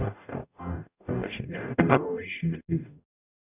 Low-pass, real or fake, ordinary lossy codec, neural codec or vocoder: 3.6 kHz; fake; none; codec, 44.1 kHz, 0.9 kbps, DAC